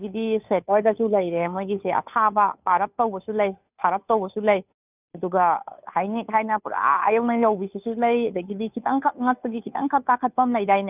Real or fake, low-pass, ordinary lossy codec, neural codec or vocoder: fake; 3.6 kHz; none; codec, 16 kHz, 2 kbps, FunCodec, trained on Chinese and English, 25 frames a second